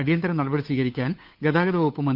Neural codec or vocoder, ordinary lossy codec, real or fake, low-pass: codec, 16 kHz, 8 kbps, FunCodec, trained on Chinese and English, 25 frames a second; Opus, 24 kbps; fake; 5.4 kHz